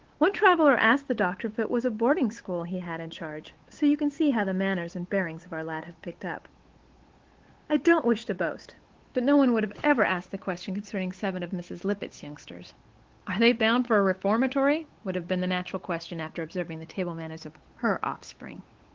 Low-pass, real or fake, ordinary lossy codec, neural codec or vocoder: 7.2 kHz; fake; Opus, 16 kbps; codec, 16 kHz, 8 kbps, FunCodec, trained on Chinese and English, 25 frames a second